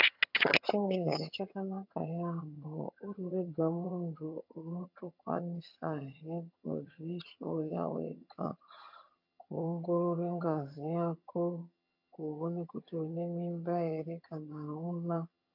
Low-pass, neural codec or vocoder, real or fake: 5.4 kHz; vocoder, 22.05 kHz, 80 mel bands, HiFi-GAN; fake